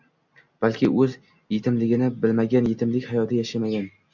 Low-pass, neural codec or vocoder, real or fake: 7.2 kHz; none; real